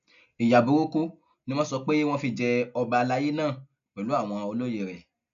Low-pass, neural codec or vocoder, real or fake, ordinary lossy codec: 7.2 kHz; none; real; none